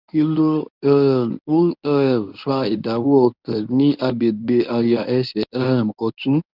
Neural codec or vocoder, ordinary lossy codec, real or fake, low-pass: codec, 24 kHz, 0.9 kbps, WavTokenizer, medium speech release version 1; none; fake; 5.4 kHz